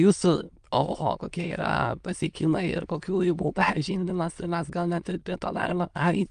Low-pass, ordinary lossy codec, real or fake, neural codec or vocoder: 9.9 kHz; Opus, 32 kbps; fake; autoencoder, 22.05 kHz, a latent of 192 numbers a frame, VITS, trained on many speakers